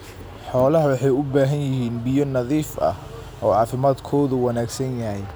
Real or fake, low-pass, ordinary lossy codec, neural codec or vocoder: real; none; none; none